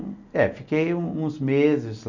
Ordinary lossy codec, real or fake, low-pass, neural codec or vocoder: none; real; 7.2 kHz; none